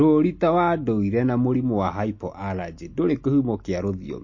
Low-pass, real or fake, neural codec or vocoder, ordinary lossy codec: 7.2 kHz; real; none; MP3, 32 kbps